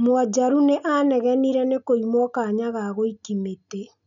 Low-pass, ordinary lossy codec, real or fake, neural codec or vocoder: 7.2 kHz; none; real; none